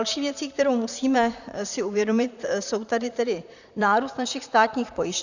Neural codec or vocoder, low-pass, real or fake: vocoder, 44.1 kHz, 128 mel bands, Pupu-Vocoder; 7.2 kHz; fake